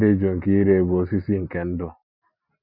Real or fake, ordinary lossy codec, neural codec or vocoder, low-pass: real; Opus, 64 kbps; none; 5.4 kHz